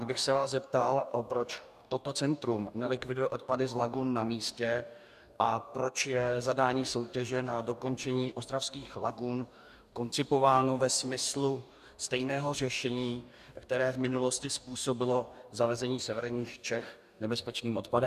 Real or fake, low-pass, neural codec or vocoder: fake; 14.4 kHz; codec, 44.1 kHz, 2.6 kbps, DAC